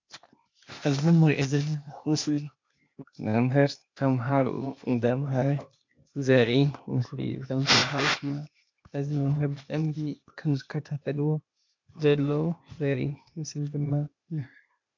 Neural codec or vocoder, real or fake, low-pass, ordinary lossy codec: codec, 16 kHz, 0.8 kbps, ZipCodec; fake; 7.2 kHz; MP3, 64 kbps